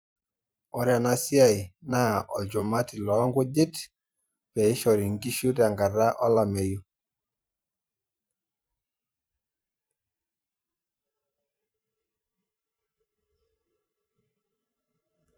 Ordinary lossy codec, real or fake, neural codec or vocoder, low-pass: none; fake; vocoder, 44.1 kHz, 128 mel bands every 512 samples, BigVGAN v2; none